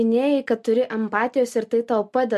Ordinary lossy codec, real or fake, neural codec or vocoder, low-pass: MP3, 64 kbps; real; none; 14.4 kHz